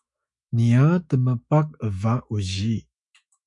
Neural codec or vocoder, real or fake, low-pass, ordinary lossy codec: autoencoder, 48 kHz, 128 numbers a frame, DAC-VAE, trained on Japanese speech; fake; 10.8 kHz; MP3, 96 kbps